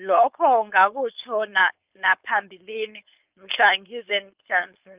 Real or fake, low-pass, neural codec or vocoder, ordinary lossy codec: fake; 3.6 kHz; codec, 16 kHz, 4.8 kbps, FACodec; Opus, 32 kbps